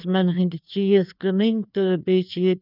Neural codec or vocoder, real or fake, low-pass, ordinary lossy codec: codec, 16 kHz, 2 kbps, FreqCodec, larger model; fake; 5.4 kHz; none